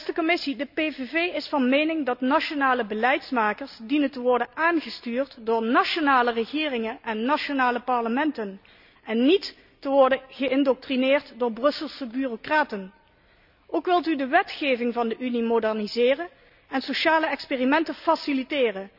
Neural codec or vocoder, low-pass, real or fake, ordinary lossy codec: none; 5.4 kHz; real; none